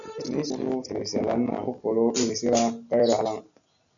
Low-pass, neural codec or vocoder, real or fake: 7.2 kHz; none; real